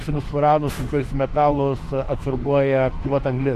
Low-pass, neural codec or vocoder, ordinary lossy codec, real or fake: 14.4 kHz; autoencoder, 48 kHz, 32 numbers a frame, DAC-VAE, trained on Japanese speech; AAC, 64 kbps; fake